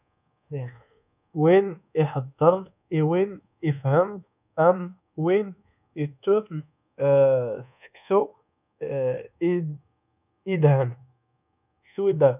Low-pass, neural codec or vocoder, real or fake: 3.6 kHz; codec, 24 kHz, 1.2 kbps, DualCodec; fake